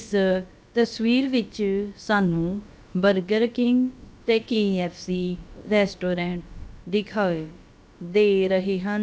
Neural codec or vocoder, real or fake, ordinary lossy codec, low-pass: codec, 16 kHz, about 1 kbps, DyCAST, with the encoder's durations; fake; none; none